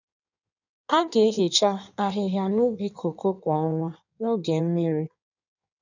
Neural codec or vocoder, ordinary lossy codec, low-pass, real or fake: codec, 16 kHz in and 24 kHz out, 1.1 kbps, FireRedTTS-2 codec; none; 7.2 kHz; fake